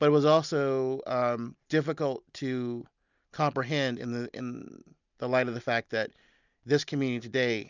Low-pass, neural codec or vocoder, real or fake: 7.2 kHz; none; real